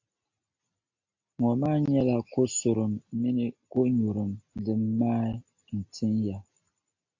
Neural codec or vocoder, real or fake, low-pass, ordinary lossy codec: none; real; 7.2 kHz; AAC, 48 kbps